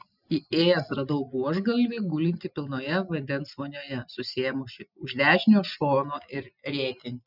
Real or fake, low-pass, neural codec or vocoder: real; 5.4 kHz; none